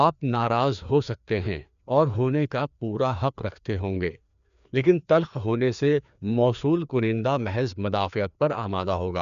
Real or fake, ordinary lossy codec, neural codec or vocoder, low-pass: fake; none; codec, 16 kHz, 2 kbps, FreqCodec, larger model; 7.2 kHz